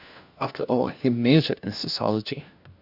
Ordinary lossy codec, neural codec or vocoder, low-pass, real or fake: Opus, 64 kbps; codec, 16 kHz, 1 kbps, FunCodec, trained on LibriTTS, 50 frames a second; 5.4 kHz; fake